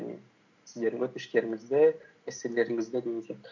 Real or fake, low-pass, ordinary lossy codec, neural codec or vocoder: real; 7.2 kHz; MP3, 48 kbps; none